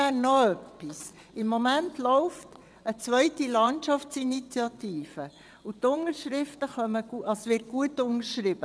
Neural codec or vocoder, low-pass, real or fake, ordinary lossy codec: vocoder, 22.05 kHz, 80 mel bands, WaveNeXt; none; fake; none